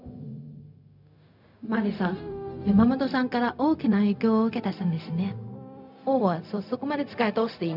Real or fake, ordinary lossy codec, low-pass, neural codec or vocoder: fake; none; 5.4 kHz; codec, 16 kHz, 0.4 kbps, LongCat-Audio-Codec